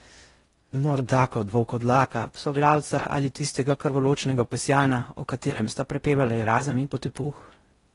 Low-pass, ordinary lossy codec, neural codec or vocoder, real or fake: 10.8 kHz; AAC, 32 kbps; codec, 16 kHz in and 24 kHz out, 0.6 kbps, FocalCodec, streaming, 4096 codes; fake